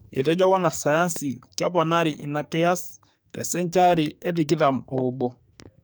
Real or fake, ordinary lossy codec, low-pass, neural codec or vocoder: fake; none; none; codec, 44.1 kHz, 2.6 kbps, SNAC